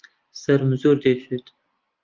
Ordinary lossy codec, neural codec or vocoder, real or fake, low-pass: Opus, 32 kbps; none; real; 7.2 kHz